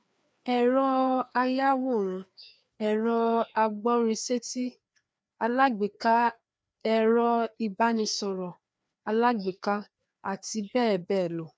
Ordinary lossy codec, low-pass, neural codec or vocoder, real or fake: none; none; codec, 16 kHz, 2 kbps, FreqCodec, larger model; fake